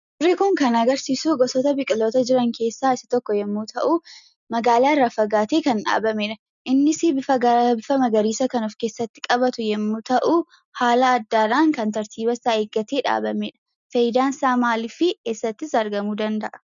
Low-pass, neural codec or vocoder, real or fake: 7.2 kHz; none; real